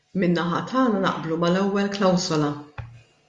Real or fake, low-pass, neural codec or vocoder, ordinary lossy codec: real; 10.8 kHz; none; Opus, 64 kbps